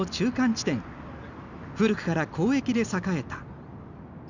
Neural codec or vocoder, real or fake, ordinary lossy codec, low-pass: none; real; none; 7.2 kHz